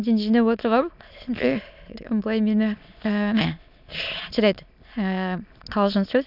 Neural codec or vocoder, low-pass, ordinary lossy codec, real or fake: autoencoder, 22.05 kHz, a latent of 192 numbers a frame, VITS, trained on many speakers; 5.4 kHz; none; fake